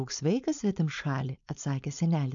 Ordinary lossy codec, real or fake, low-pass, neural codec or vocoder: MP3, 64 kbps; fake; 7.2 kHz; codec, 16 kHz, 4.8 kbps, FACodec